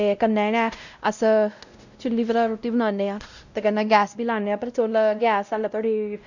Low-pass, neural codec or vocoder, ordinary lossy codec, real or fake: 7.2 kHz; codec, 16 kHz, 0.5 kbps, X-Codec, WavLM features, trained on Multilingual LibriSpeech; none; fake